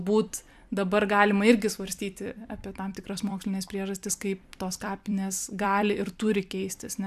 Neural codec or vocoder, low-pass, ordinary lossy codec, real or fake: vocoder, 44.1 kHz, 128 mel bands every 256 samples, BigVGAN v2; 14.4 kHz; AAC, 96 kbps; fake